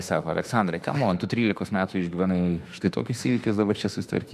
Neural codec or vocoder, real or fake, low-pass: autoencoder, 48 kHz, 32 numbers a frame, DAC-VAE, trained on Japanese speech; fake; 14.4 kHz